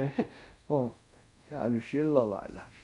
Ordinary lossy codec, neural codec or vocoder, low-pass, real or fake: none; codec, 24 kHz, 0.5 kbps, DualCodec; 10.8 kHz; fake